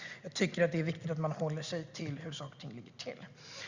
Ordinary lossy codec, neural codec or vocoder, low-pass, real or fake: Opus, 64 kbps; none; 7.2 kHz; real